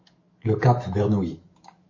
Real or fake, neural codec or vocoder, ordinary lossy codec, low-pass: fake; autoencoder, 48 kHz, 128 numbers a frame, DAC-VAE, trained on Japanese speech; MP3, 32 kbps; 7.2 kHz